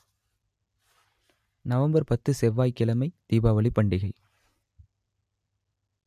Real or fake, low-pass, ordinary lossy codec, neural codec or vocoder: real; 14.4 kHz; MP3, 96 kbps; none